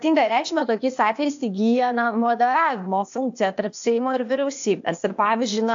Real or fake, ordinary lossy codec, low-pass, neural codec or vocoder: fake; AAC, 64 kbps; 7.2 kHz; codec, 16 kHz, 0.8 kbps, ZipCodec